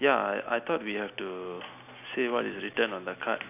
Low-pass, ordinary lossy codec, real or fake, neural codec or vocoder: 3.6 kHz; none; real; none